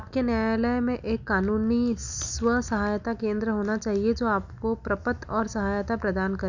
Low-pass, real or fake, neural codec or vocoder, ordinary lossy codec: 7.2 kHz; real; none; none